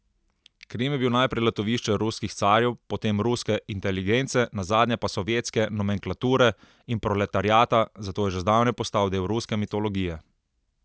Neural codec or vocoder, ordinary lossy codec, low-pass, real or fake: none; none; none; real